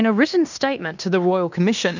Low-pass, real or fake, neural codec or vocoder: 7.2 kHz; fake; codec, 16 kHz in and 24 kHz out, 0.9 kbps, LongCat-Audio-Codec, fine tuned four codebook decoder